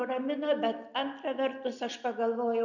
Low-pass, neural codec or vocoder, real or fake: 7.2 kHz; none; real